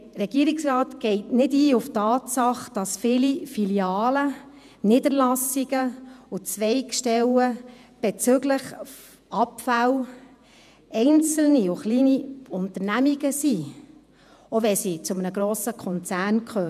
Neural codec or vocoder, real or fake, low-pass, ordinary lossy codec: vocoder, 48 kHz, 128 mel bands, Vocos; fake; 14.4 kHz; none